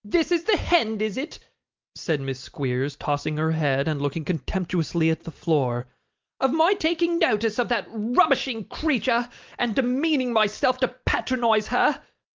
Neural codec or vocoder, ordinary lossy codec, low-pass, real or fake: none; Opus, 32 kbps; 7.2 kHz; real